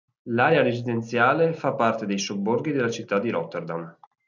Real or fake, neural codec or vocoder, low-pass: real; none; 7.2 kHz